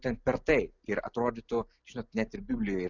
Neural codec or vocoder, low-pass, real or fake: none; 7.2 kHz; real